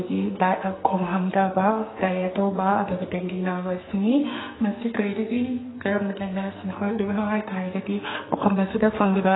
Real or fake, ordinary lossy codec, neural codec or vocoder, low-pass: fake; AAC, 16 kbps; codec, 44.1 kHz, 2.6 kbps, SNAC; 7.2 kHz